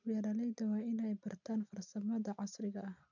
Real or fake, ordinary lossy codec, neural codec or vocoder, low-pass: real; none; none; 7.2 kHz